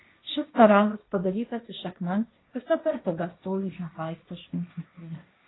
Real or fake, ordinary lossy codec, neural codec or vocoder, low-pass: fake; AAC, 16 kbps; codec, 16 kHz, 1.1 kbps, Voila-Tokenizer; 7.2 kHz